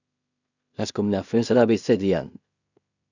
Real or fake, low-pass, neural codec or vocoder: fake; 7.2 kHz; codec, 16 kHz in and 24 kHz out, 0.4 kbps, LongCat-Audio-Codec, two codebook decoder